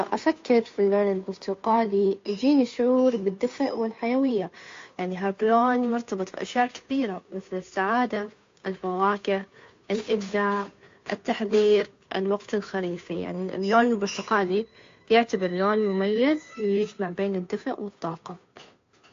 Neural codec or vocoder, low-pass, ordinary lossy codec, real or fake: codec, 16 kHz, 2 kbps, FunCodec, trained on Chinese and English, 25 frames a second; 7.2 kHz; AAC, 48 kbps; fake